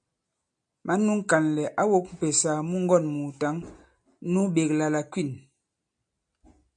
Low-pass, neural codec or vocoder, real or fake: 9.9 kHz; none; real